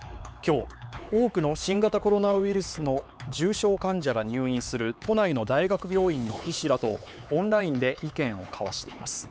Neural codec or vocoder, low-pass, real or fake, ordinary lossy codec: codec, 16 kHz, 4 kbps, X-Codec, HuBERT features, trained on LibriSpeech; none; fake; none